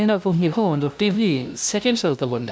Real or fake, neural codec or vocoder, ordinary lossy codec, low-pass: fake; codec, 16 kHz, 0.5 kbps, FunCodec, trained on LibriTTS, 25 frames a second; none; none